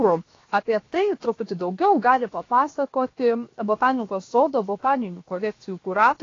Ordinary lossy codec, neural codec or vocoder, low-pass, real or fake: AAC, 32 kbps; codec, 16 kHz, 0.7 kbps, FocalCodec; 7.2 kHz; fake